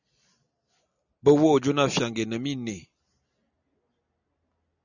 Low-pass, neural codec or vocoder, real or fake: 7.2 kHz; none; real